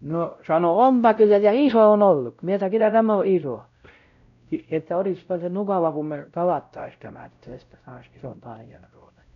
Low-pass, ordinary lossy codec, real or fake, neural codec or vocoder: 7.2 kHz; none; fake; codec, 16 kHz, 0.5 kbps, X-Codec, WavLM features, trained on Multilingual LibriSpeech